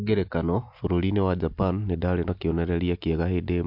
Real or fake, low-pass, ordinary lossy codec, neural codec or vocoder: real; 5.4 kHz; MP3, 48 kbps; none